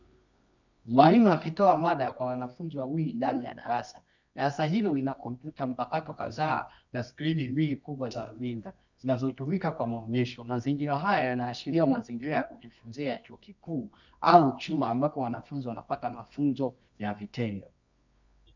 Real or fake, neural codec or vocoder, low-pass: fake; codec, 24 kHz, 0.9 kbps, WavTokenizer, medium music audio release; 7.2 kHz